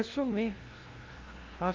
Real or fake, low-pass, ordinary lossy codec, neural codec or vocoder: fake; 7.2 kHz; Opus, 16 kbps; codec, 16 kHz, 0.5 kbps, FunCodec, trained on LibriTTS, 25 frames a second